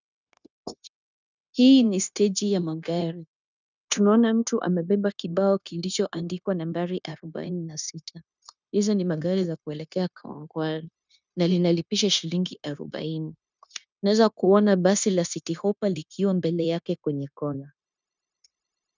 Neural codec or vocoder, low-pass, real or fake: codec, 16 kHz, 0.9 kbps, LongCat-Audio-Codec; 7.2 kHz; fake